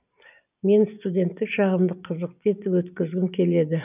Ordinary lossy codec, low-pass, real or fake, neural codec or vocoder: none; 3.6 kHz; real; none